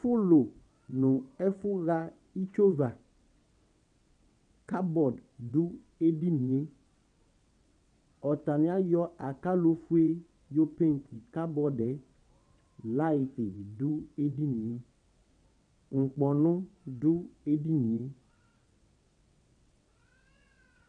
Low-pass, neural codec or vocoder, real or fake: 9.9 kHz; vocoder, 22.05 kHz, 80 mel bands, WaveNeXt; fake